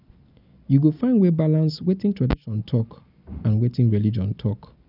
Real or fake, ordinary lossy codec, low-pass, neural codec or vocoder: real; none; 5.4 kHz; none